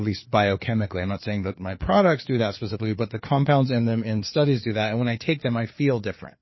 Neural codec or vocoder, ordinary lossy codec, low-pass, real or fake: codec, 16 kHz, 4 kbps, FreqCodec, larger model; MP3, 24 kbps; 7.2 kHz; fake